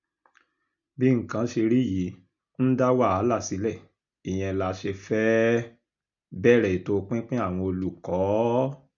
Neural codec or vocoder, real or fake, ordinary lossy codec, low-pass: none; real; none; 7.2 kHz